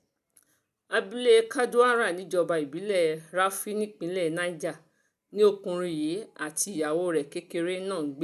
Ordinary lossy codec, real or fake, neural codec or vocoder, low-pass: none; real; none; 14.4 kHz